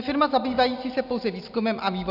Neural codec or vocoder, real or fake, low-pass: none; real; 5.4 kHz